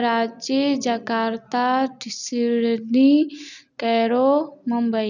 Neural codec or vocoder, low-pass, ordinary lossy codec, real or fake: none; 7.2 kHz; none; real